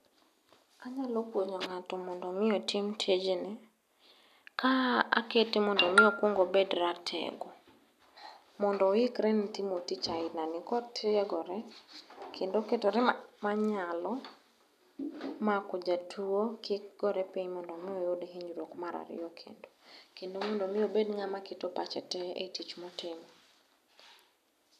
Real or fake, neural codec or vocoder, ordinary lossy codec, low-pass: real; none; none; 14.4 kHz